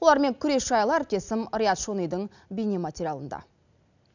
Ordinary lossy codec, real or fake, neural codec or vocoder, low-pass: none; real; none; 7.2 kHz